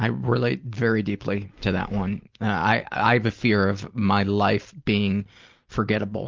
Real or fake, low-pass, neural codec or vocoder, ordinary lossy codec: real; 7.2 kHz; none; Opus, 32 kbps